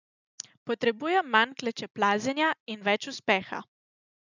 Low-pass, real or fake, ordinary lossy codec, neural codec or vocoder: 7.2 kHz; real; none; none